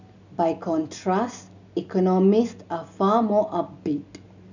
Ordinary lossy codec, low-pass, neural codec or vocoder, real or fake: none; 7.2 kHz; none; real